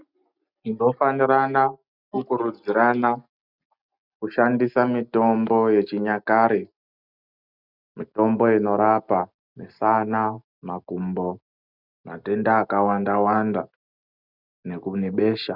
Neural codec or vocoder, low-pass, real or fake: none; 5.4 kHz; real